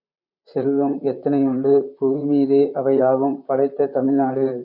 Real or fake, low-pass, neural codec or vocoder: fake; 5.4 kHz; vocoder, 44.1 kHz, 128 mel bands, Pupu-Vocoder